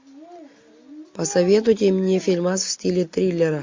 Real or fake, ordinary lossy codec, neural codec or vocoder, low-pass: real; MP3, 48 kbps; none; 7.2 kHz